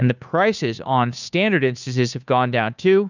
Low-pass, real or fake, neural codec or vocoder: 7.2 kHz; fake; codec, 16 kHz, 2 kbps, FunCodec, trained on Chinese and English, 25 frames a second